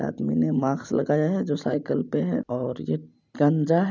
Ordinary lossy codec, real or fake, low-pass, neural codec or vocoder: none; real; 7.2 kHz; none